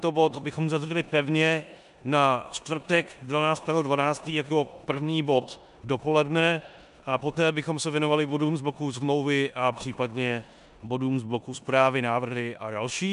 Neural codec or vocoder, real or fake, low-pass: codec, 16 kHz in and 24 kHz out, 0.9 kbps, LongCat-Audio-Codec, four codebook decoder; fake; 10.8 kHz